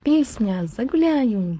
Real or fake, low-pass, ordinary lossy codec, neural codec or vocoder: fake; none; none; codec, 16 kHz, 4.8 kbps, FACodec